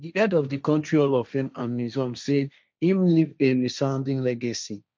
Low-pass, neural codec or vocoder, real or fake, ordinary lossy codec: none; codec, 16 kHz, 1.1 kbps, Voila-Tokenizer; fake; none